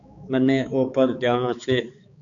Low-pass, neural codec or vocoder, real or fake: 7.2 kHz; codec, 16 kHz, 4 kbps, X-Codec, HuBERT features, trained on balanced general audio; fake